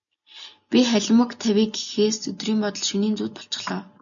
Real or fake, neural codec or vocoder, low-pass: real; none; 7.2 kHz